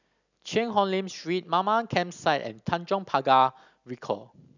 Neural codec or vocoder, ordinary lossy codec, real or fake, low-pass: none; none; real; 7.2 kHz